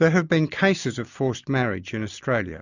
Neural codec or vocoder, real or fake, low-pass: none; real; 7.2 kHz